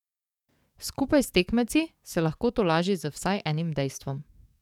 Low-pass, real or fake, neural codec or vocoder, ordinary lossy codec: 19.8 kHz; fake; codec, 44.1 kHz, 7.8 kbps, DAC; none